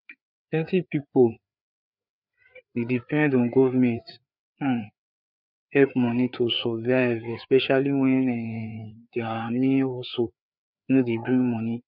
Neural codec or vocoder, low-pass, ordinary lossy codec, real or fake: codec, 16 kHz, 4 kbps, FreqCodec, larger model; 5.4 kHz; none; fake